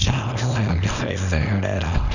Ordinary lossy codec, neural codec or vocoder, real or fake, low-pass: none; codec, 24 kHz, 0.9 kbps, WavTokenizer, small release; fake; 7.2 kHz